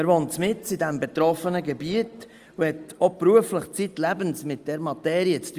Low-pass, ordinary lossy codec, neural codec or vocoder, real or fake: 14.4 kHz; Opus, 32 kbps; none; real